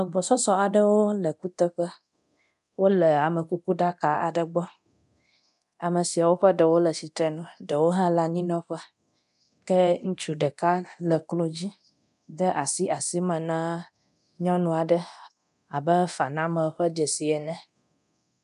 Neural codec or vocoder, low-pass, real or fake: codec, 24 kHz, 0.9 kbps, DualCodec; 10.8 kHz; fake